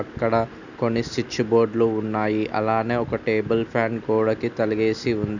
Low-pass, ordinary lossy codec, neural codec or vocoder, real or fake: 7.2 kHz; none; none; real